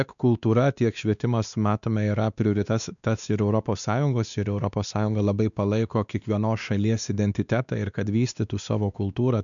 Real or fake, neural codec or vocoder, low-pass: fake; codec, 16 kHz, 2 kbps, X-Codec, WavLM features, trained on Multilingual LibriSpeech; 7.2 kHz